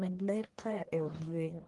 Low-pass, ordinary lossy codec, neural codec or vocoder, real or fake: 10.8 kHz; Opus, 24 kbps; codec, 44.1 kHz, 1.7 kbps, Pupu-Codec; fake